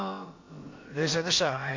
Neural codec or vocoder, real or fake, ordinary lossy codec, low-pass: codec, 16 kHz, about 1 kbps, DyCAST, with the encoder's durations; fake; MP3, 48 kbps; 7.2 kHz